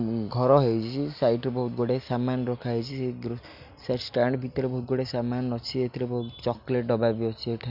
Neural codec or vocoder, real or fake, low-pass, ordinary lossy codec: none; real; 5.4 kHz; none